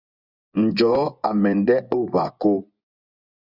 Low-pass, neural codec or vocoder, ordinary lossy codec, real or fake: 5.4 kHz; vocoder, 24 kHz, 100 mel bands, Vocos; Opus, 64 kbps; fake